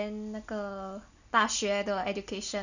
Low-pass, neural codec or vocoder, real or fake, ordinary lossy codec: 7.2 kHz; none; real; none